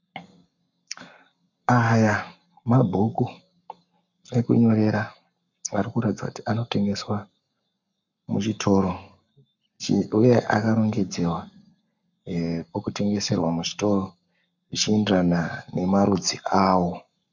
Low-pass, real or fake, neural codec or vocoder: 7.2 kHz; fake; codec, 44.1 kHz, 7.8 kbps, Pupu-Codec